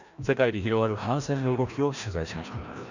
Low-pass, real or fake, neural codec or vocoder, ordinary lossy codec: 7.2 kHz; fake; codec, 16 kHz, 1 kbps, FreqCodec, larger model; none